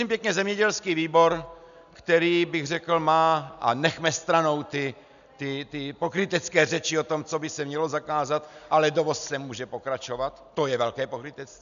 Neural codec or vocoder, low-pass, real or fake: none; 7.2 kHz; real